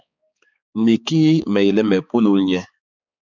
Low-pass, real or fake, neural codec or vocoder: 7.2 kHz; fake; codec, 16 kHz, 4 kbps, X-Codec, HuBERT features, trained on balanced general audio